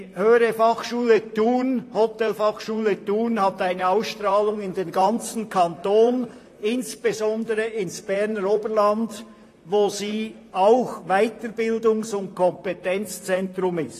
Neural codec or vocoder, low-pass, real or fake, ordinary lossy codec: vocoder, 44.1 kHz, 128 mel bands, Pupu-Vocoder; 14.4 kHz; fake; AAC, 48 kbps